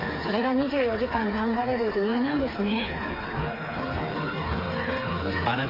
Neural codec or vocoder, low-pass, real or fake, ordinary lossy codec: codec, 16 kHz, 4 kbps, FreqCodec, smaller model; 5.4 kHz; fake; AAC, 24 kbps